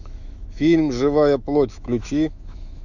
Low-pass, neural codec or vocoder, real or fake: 7.2 kHz; autoencoder, 48 kHz, 128 numbers a frame, DAC-VAE, trained on Japanese speech; fake